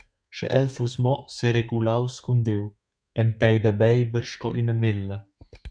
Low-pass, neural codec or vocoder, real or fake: 9.9 kHz; codec, 32 kHz, 1.9 kbps, SNAC; fake